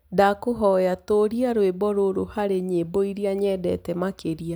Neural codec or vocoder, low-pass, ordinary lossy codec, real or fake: none; none; none; real